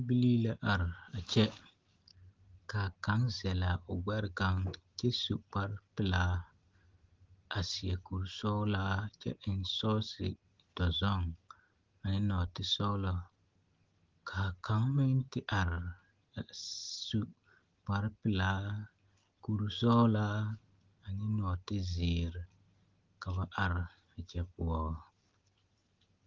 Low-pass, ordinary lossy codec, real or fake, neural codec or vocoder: 7.2 kHz; Opus, 16 kbps; real; none